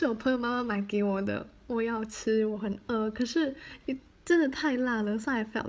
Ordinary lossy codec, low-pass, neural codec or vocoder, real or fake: none; none; codec, 16 kHz, 16 kbps, FreqCodec, larger model; fake